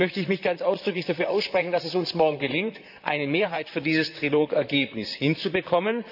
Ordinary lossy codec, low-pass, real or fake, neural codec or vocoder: AAC, 48 kbps; 5.4 kHz; fake; vocoder, 44.1 kHz, 128 mel bands, Pupu-Vocoder